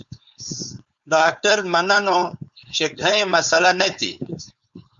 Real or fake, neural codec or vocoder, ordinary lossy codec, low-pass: fake; codec, 16 kHz, 4.8 kbps, FACodec; Opus, 64 kbps; 7.2 kHz